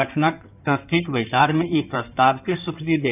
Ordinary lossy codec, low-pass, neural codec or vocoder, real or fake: none; 3.6 kHz; codec, 16 kHz in and 24 kHz out, 2.2 kbps, FireRedTTS-2 codec; fake